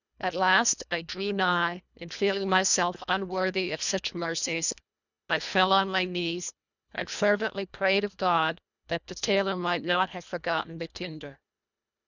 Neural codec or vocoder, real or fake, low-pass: codec, 24 kHz, 1.5 kbps, HILCodec; fake; 7.2 kHz